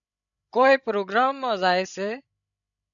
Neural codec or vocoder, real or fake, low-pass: codec, 16 kHz, 16 kbps, FreqCodec, larger model; fake; 7.2 kHz